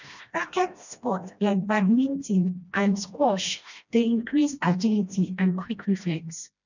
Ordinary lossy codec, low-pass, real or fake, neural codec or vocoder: none; 7.2 kHz; fake; codec, 16 kHz, 1 kbps, FreqCodec, smaller model